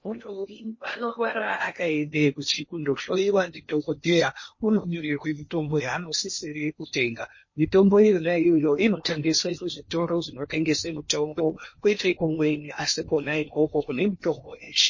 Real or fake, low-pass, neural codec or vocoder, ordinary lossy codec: fake; 7.2 kHz; codec, 16 kHz in and 24 kHz out, 0.8 kbps, FocalCodec, streaming, 65536 codes; MP3, 32 kbps